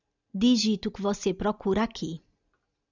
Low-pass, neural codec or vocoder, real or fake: 7.2 kHz; none; real